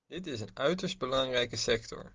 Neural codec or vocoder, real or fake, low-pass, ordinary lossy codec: none; real; 7.2 kHz; Opus, 32 kbps